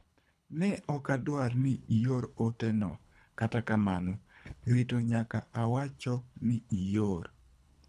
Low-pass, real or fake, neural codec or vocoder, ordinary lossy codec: none; fake; codec, 24 kHz, 3 kbps, HILCodec; none